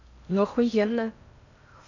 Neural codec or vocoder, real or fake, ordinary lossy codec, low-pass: codec, 16 kHz in and 24 kHz out, 0.6 kbps, FocalCodec, streaming, 2048 codes; fake; none; 7.2 kHz